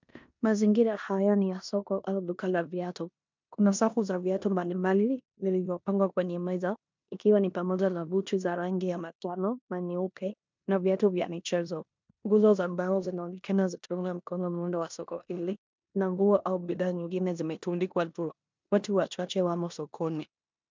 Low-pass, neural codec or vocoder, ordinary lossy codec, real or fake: 7.2 kHz; codec, 16 kHz in and 24 kHz out, 0.9 kbps, LongCat-Audio-Codec, four codebook decoder; MP3, 64 kbps; fake